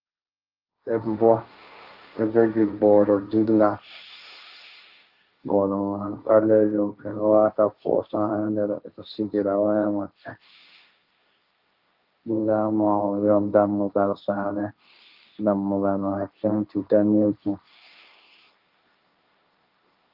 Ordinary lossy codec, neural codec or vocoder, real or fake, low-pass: Opus, 24 kbps; codec, 16 kHz, 1.1 kbps, Voila-Tokenizer; fake; 5.4 kHz